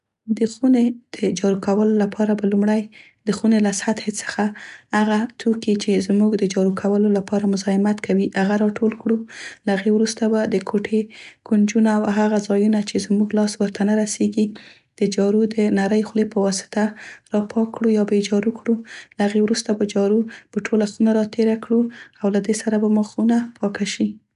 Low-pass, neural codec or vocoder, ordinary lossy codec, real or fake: 10.8 kHz; none; none; real